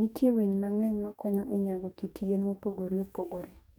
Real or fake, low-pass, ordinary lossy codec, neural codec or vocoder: fake; 19.8 kHz; none; codec, 44.1 kHz, 2.6 kbps, DAC